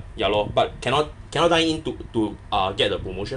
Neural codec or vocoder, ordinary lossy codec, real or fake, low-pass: none; MP3, 96 kbps; real; 10.8 kHz